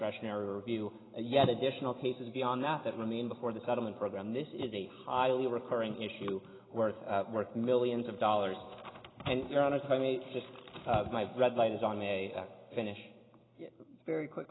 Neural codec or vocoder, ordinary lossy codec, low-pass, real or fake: none; AAC, 16 kbps; 7.2 kHz; real